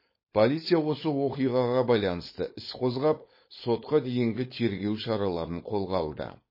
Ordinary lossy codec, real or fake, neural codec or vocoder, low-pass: MP3, 24 kbps; fake; codec, 16 kHz, 4.8 kbps, FACodec; 5.4 kHz